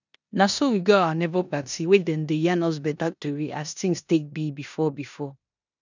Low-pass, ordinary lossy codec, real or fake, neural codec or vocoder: 7.2 kHz; none; fake; codec, 16 kHz in and 24 kHz out, 0.9 kbps, LongCat-Audio-Codec, four codebook decoder